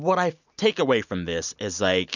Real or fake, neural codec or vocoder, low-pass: real; none; 7.2 kHz